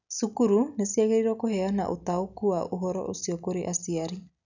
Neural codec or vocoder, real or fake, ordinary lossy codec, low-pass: none; real; none; 7.2 kHz